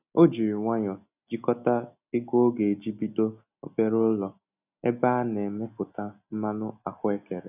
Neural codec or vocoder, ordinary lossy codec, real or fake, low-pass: none; none; real; 3.6 kHz